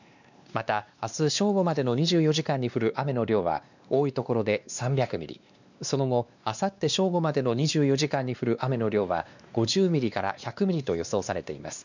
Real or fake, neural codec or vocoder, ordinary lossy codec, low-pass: fake; codec, 16 kHz, 2 kbps, X-Codec, WavLM features, trained on Multilingual LibriSpeech; none; 7.2 kHz